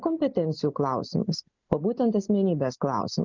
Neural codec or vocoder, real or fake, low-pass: none; real; 7.2 kHz